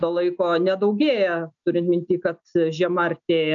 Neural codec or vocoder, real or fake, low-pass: none; real; 7.2 kHz